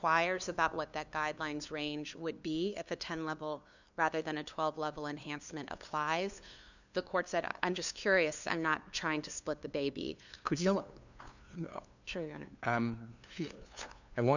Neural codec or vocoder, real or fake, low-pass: codec, 16 kHz, 2 kbps, FunCodec, trained on LibriTTS, 25 frames a second; fake; 7.2 kHz